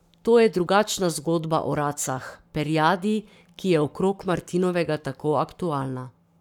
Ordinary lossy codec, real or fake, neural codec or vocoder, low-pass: none; fake; codec, 44.1 kHz, 7.8 kbps, Pupu-Codec; 19.8 kHz